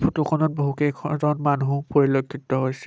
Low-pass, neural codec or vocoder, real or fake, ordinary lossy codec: none; none; real; none